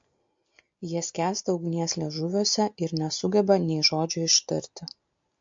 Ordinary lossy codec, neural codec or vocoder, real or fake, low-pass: MP3, 48 kbps; none; real; 7.2 kHz